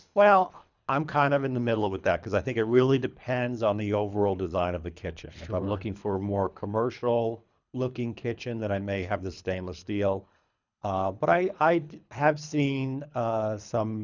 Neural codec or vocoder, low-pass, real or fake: codec, 24 kHz, 3 kbps, HILCodec; 7.2 kHz; fake